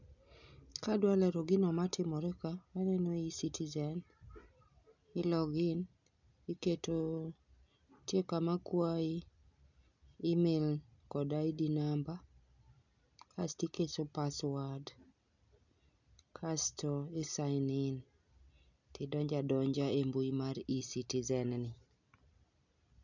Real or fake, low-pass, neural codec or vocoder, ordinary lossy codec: real; 7.2 kHz; none; none